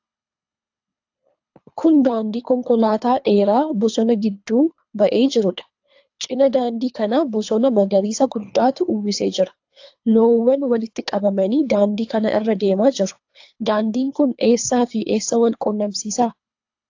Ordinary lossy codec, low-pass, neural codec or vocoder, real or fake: AAC, 48 kbps; 7.2 kHz; codec, 24 kHz, 3 kbps, HILCodec; fake